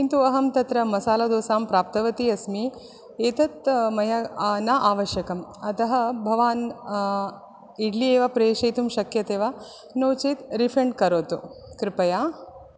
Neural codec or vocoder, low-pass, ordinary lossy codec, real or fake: none; none; none; real